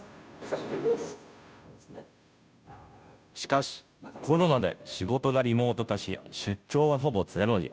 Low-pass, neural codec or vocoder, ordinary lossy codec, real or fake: none; codec, 16 kHz, 0.5 kbps, FunCodec, trained on Chinese and English, 25 frames a second; none; fake